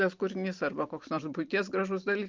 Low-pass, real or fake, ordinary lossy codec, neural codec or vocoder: 7.2 kHz; real; Opus, 24 kbps; none